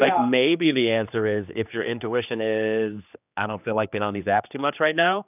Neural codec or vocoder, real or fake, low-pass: codec, 16 kHz, 2 kbps, X-Codec, HuBERT features, trained on general audio; fake; 3.6 kHz